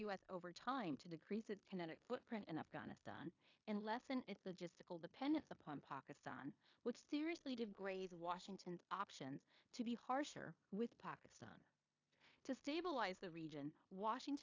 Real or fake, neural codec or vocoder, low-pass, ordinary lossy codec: fake; codec, 16 kHz in and 24 kHz out, 0.9 kbps, LongCat-Audio-Codec, four codebook decoder; 7.2 kHz; AAC, 48 kbps